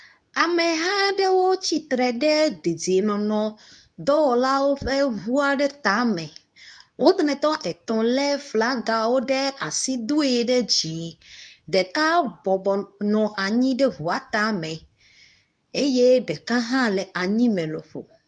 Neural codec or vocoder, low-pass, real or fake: codec, 24 kHz, 0.9 kbps, WavTokenizer, medium speech release version 2; 9.9 kHz; fake